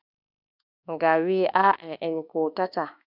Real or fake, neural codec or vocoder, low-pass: fake; autoencoder, 48 kHz, 32 numbers a frame, DAC-VAE, trained on Japanese speech; 5.4 kHz